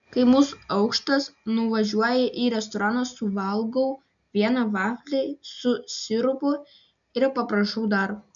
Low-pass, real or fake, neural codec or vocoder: 7.2 kHz; real; none